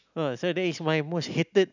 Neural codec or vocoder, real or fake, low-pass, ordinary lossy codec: none; real; 7.2 kHz; none